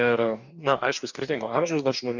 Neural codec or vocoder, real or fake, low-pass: codec, 44.1 kHz, 2.6 kbps, DAC; fake; 7.2 kHz